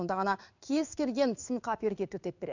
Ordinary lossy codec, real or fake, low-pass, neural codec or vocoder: none; fake; 7.2 kHz; codec, 16 kHz in and 24 kHz out, 1 kbps, XY-Tokenizer